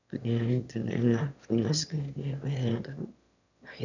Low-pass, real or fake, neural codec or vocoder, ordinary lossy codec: 7.2 kHz; fake; autoencoder, 22.05 kHz, a latent of 192 numbers a frame, VITS, trained on one speaker; none